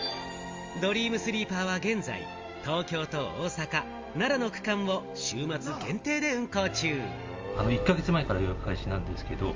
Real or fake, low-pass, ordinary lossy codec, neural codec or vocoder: real; 7.2 kHz; Opus, 32 kbps; none